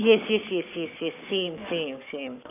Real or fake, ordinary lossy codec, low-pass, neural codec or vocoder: fake; none; 3.6 kHz; codec, 44.1 kHz, 7.8 kbps, Pupu-Codec